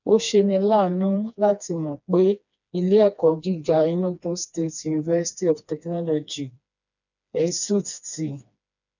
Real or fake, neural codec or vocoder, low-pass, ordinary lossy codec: fake; codec, 16 kHz, 2 kbps, FreqCodec, smaller model; 7.2 kHz; none